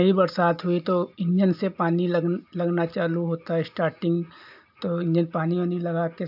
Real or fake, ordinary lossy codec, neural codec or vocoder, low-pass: real; AAC, 48 kbps; none; 5.4 kHz